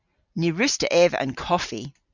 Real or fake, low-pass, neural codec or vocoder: real; 7.2 kHz; none